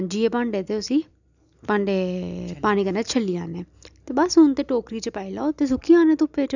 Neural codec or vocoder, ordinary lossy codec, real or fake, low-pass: none; none; real; 7.2 kHz